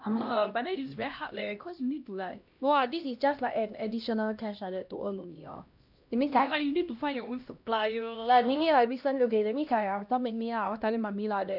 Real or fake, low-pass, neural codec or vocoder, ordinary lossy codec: fake; 5.4 kHz; codec, 16 kHz, 1 kbps, X-Codec, HuBERT features, trained on LibriSpeech; none